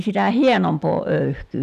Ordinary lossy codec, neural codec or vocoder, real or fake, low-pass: none; none; real; 14.4 kHz